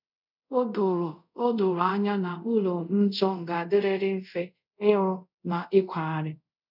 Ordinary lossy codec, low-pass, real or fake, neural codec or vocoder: none; 5.4 kHz; fake; codec, 24 kHz, 0.5 kbps, DualCodec